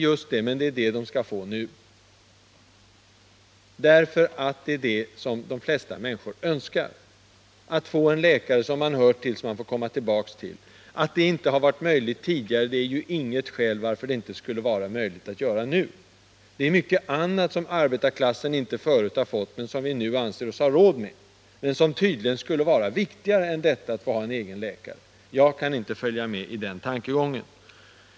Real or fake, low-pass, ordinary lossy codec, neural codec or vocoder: real; none; none; none